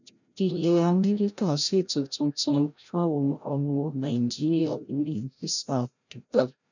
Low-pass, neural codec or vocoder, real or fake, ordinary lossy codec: 7.2 kHz; codec, 16 kHz, 0.5 kbps, FreqCodec, larger model; fake; none